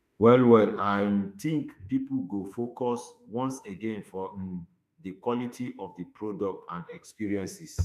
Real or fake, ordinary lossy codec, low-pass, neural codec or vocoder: fake; none; 14.4 kHz; autoencoder, 48 kHz, 32 numbers a frame, DAC-VAE, trained on Japanese speech